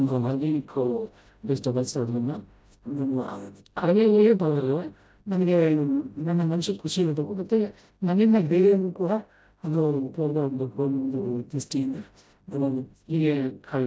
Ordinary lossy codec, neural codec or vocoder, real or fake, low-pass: none; codec, 16 kHz, 0.5 kbps, FreqCodec, smaller model; fake; none